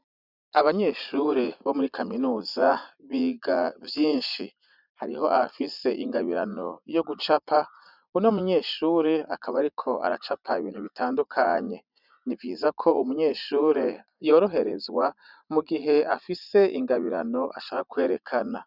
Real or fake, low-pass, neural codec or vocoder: fake; 5.4 kHz; vocoder, 44.1 kHz, 80 mel bands, Vocos